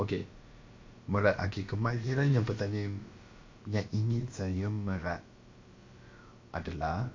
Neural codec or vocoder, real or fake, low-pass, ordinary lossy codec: codec, 16 kHz, about 1 kbps, DyCAST, with the encoder's durations; fake; 7.2 kHz; MP3, 48 kbps